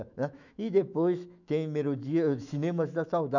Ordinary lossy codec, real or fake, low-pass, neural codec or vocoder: none; fake; 7.2 kHz; autoencoder, 48 kHz, 128 numbers a frame, DAC-VAE, trained on Japanese speech